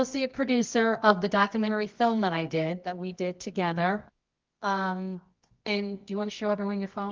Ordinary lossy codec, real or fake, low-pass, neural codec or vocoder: Opus, 32 kbps; fake; 7.2 kHz; codec, 24 kHz, 0.9 kbps, WavTokenizer, medium music audio release